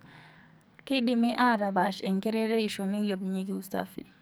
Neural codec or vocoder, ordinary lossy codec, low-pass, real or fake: codec, 44.1 kHz, 2.6 kbps, SNAC; none; none; fake